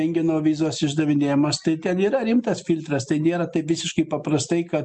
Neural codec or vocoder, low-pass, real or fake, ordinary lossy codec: none; 10.8 kHz; real; MP3, 64 kbps